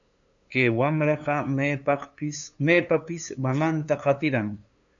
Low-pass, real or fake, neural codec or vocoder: 7.2 kHz; fake; codec, 16 kHz, 2 kbps, FunCodec, trained on LibriTTS, 25 frames a second